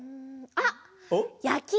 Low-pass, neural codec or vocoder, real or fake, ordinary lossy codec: none; none; real; none